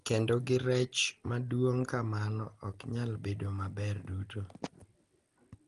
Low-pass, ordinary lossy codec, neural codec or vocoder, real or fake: 10.8 kHz; Opus, 24 kbps; none; real